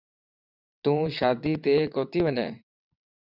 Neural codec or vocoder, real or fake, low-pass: vocoder, 22.05 kHz, 80 mel bands, WaveNeXt; fake; 5.4 kHz